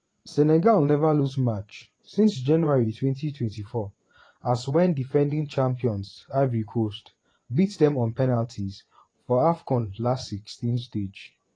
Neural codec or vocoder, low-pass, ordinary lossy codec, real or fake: vocoder, 24 kHz, 100 mel bands, Vocos; 9.9 kHz; AAC, 32 kbps; fake